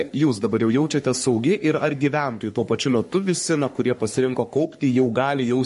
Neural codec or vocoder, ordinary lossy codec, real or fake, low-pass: codec, 44.1 kHz, 3.4 kbps, Pupu-Codec; MP3, 48 kbps; fake; 14.4 kHz